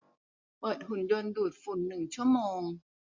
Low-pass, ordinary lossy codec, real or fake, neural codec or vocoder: 7.2 kHz; MP3, 64 kbps; real; none